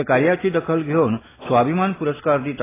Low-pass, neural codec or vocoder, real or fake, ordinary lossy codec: 3.6 kHz; none; real; AAC, 16 kbps